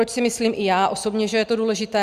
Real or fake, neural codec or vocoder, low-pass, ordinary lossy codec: real; none; 14.4 kHz; Opus, 64 kbps